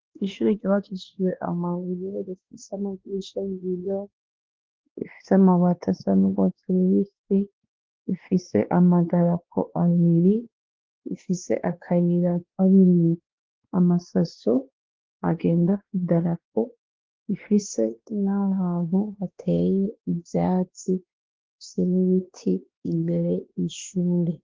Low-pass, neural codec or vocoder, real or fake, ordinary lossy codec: 7.2 kHz; codec, 16 kHz, 2 kbps, X-Codec, WavLM features, trained on Multilingual LibriSpeech; fake; Opus, 16 kbps